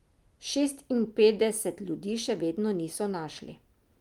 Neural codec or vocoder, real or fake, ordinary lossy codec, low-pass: none; real; Opus, 32 kbps; 19.8 kHz